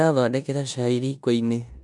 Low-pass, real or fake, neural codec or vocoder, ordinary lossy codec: 10.8 kHz; fake; codec, 16 kHz in and 24 kHz out, 0.9 kbps, LongCat-Audio-Codec, four codebook decoder; none